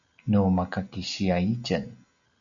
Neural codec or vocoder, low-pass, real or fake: none; 7.2 kHz; real